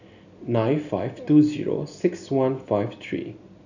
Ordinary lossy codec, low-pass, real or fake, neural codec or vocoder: none; 7.2 kHz; real; none